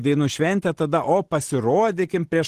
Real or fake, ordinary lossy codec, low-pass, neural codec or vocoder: real; Opus, 16 kbps; 14.4 kHz; none